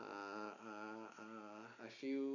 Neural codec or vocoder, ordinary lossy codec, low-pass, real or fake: none; none; 7.2 kHz; real